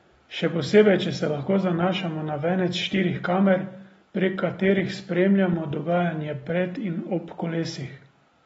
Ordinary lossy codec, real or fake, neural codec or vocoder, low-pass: AAC, 24 kbps; real; none; 19.8 kHz